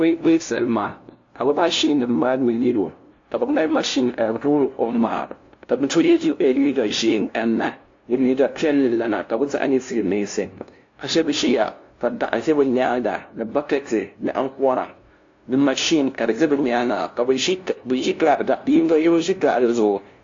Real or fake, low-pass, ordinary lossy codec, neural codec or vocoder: fake; 7.2 kHz; AAC, 48 kbps; codec, 16 kHz, 0.5 kbps, FunCodec, trained on LibriTTS, 25 frames a second